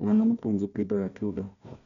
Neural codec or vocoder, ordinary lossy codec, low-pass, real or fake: codec, 16 kHz, 1 kbps, FunCodec, trained on Chinese and English, 50 frames a second; none; 7.2 kHz; fake